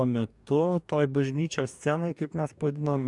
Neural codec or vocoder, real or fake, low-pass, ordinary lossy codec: codec, 44.1 kHz, 2.6 kbps, DAC; fake; 10.8 kHz; MP3, 96 kbps